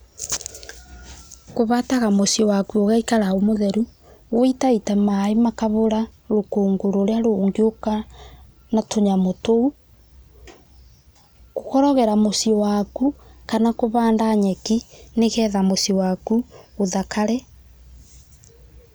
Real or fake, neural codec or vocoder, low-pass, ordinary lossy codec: real; none; none; none